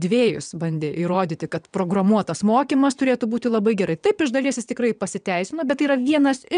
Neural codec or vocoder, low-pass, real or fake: vocoder, 22.05 kHz, 80 mel bands, WaveNeXt; 9.9 kHz; fake